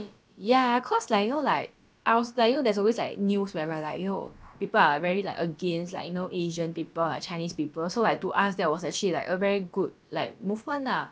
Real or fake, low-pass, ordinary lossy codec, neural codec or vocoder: fake; none; none; codec, 16 kHz, about 1 kbps, DyCAST, with the encoder's durations